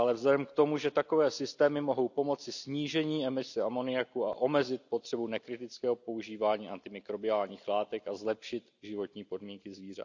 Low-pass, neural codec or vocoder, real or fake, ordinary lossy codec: 7.2 kHz; none; real; none